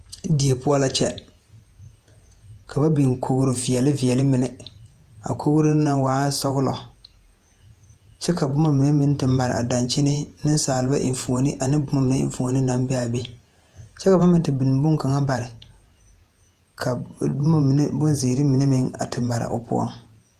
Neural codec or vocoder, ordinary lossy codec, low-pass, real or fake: vocoder, 48 kHz, 128 mel bands, Vocos; Opus, 32 kbps; 14.4 kHz; fake